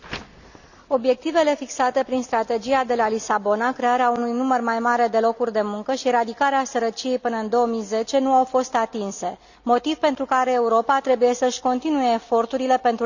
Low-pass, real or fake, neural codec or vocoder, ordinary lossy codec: 7.2 kHz; real; none; none